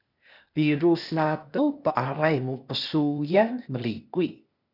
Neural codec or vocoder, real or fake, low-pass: codec, 16 kHz, 0.8 kbps, ZipCodec; fake; 5.4 kHz